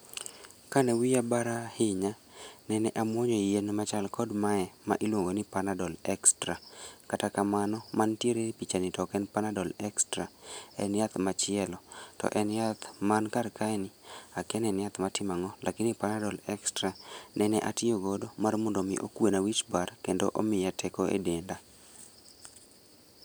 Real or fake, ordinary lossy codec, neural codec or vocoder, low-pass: real; none; none; none